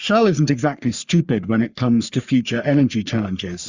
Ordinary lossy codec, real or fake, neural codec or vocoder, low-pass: Opus, 64 kbps; fake; codec, 44.1 kHz, 3.4 kbps, Pupu-Codec; 7.2 kHz